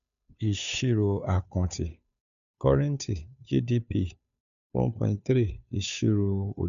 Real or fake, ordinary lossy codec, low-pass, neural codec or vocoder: fake; none; 7.2 kHz; codec, 16 kHz, 2 kbps, FunCodec, trained on Chinese and English, 25 frames a second